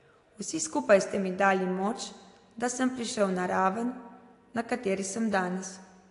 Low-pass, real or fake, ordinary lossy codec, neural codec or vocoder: 10.8 kHz; real; AAC, 48 kbps; none